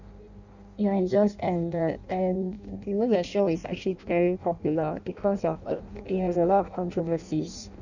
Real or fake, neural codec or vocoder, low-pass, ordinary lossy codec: fake; codec, 16 kHz in and 24 kHz out, 0.6 kbps, FireRedTTS-2 codec; 7.2 kHz; none